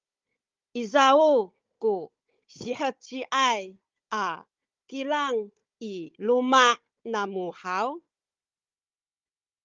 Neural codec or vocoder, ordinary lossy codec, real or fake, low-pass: codec, 16 kHz, 16 kbps, FunCodec, trained on Chinese and English, 50 frames a second; Opus, 32 kbps; fake; 7.2 kHz